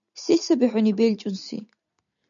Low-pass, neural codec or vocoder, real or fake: 7.2 kHz; none; real